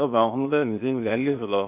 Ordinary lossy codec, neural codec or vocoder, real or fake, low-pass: none; codec, 16 kHz, 0.8 kbps, ZipCodec; fake; 3.6 kHz